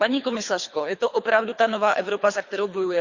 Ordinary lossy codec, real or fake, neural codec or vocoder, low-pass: Opus, 64 kbps; fake; codec, 24 kHz, 3 kbps, HILCodec; 7.2 kHz